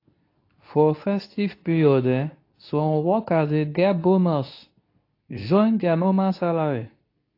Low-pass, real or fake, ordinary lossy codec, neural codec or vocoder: 5.4 kHz; fake; AAC, 32 kbps; codec, 24 kHz, 0.9 kbps, WavTokenizer, medium speech release version 2